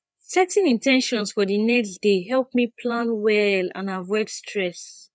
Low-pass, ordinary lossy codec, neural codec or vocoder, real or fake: none; none; codec, 16 kHz, 4 kbps, FreqCodec, larger model; fake